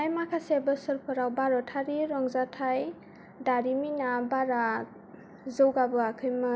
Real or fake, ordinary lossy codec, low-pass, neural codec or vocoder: real; none; none; none